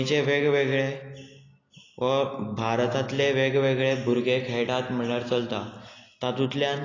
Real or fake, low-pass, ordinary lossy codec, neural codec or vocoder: real; 7.2 kHz; AAC, 32 kbps; none